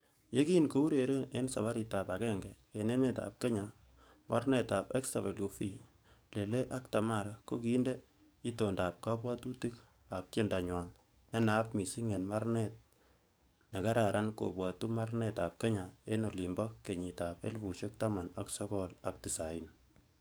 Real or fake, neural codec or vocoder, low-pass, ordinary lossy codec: fake; codec, 44.1 kHz, 7.8 kbps, DAC; none; none